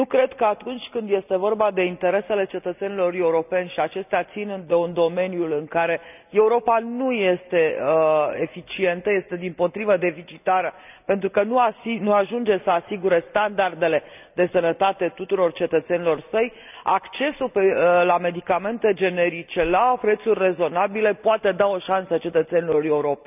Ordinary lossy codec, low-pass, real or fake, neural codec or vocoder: none; 3.6 kHz; real; none